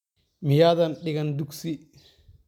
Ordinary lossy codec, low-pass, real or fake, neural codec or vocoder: none; 19.8 kHz; real; none